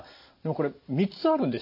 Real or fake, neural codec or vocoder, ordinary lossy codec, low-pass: real; none; none; 5.4 kHz